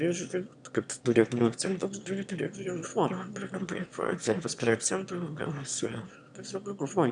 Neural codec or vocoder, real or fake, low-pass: autoencoder, 22.05 kHz, a latent of 192 numbers a frame, VITS, trained on one speaker; fake; 9.9 kHz